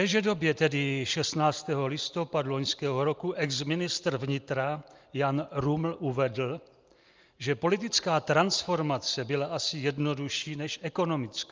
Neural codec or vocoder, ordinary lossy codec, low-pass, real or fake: none; Opus, 24 kbps; 7.2 kHz; real